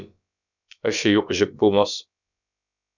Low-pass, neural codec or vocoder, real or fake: 7.2 kHz; codec, 16 kHz, about 1 kbps, DyCAST, with the encoder's durations; fake